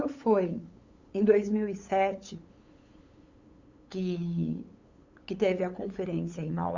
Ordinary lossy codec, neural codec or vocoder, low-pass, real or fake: Opus, 64 kbps; codec, 16 kHz, 8 kbps, FunCodec, trained on LibriTTS, 25 frames a second; 7.2 kHz; fake